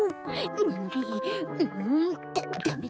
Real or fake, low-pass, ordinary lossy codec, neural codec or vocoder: fake; none; none; codec, 16 kHz, 4 kbps, X-Codec, HuBERT features, trained on balanced general audio